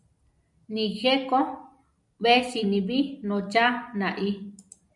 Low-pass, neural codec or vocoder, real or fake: 10.8 kHz; none; real